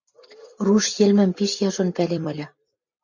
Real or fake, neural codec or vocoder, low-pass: fake; vocoder, 44.1 kHz, 128 mel bands every 256 samples, BigVGAN v2; 7.2 kHz